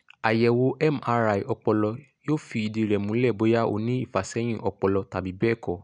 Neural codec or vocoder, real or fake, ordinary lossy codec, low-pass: none; real; none; 9.9 kHz